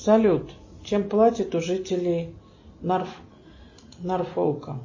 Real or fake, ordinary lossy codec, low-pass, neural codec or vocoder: real; MP3, 32 kbps; 7.2 kHz; none